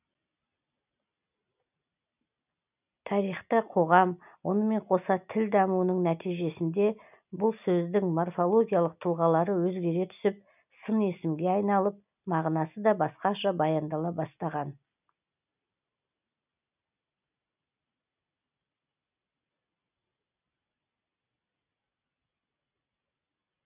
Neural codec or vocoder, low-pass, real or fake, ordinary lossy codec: none; 3.6 kHz; real; none